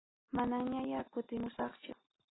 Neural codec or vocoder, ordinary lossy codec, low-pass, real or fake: none; AAC, 16 kbps; 7.2 kHz; real